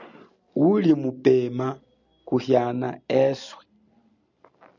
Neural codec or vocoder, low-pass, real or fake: none; 7.2 kHz; real